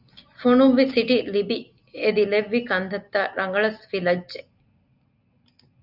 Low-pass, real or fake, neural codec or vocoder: 5.4 kHz; real; none